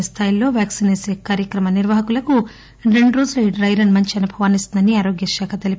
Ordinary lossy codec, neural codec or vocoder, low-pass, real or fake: none; none; none; real